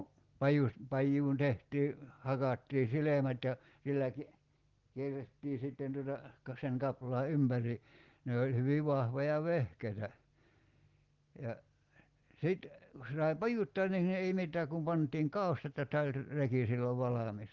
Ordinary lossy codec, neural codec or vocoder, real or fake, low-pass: Opus, 16 kbps; none; real; 7.2 kHz